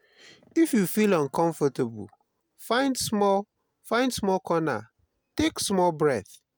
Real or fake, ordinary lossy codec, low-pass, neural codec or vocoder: real; none; none; none